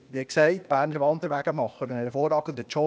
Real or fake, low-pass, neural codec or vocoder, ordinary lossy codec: fake; none; codec, 16 kHz, 0.8 kbps, ZipCodec; none